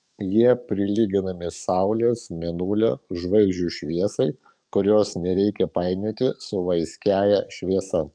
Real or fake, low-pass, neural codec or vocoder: fake; 9.9 kHz; codec, 44.1 kHz, 7.8 kbps, DAC